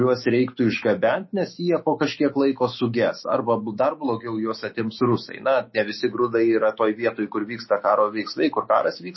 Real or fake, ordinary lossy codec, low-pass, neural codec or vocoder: fake; MP3, 24 kbps; 7.2 kHz; autoencoder, 48 kHz, 128 numbers a frame, DAC-VAE, trained on Japanese speech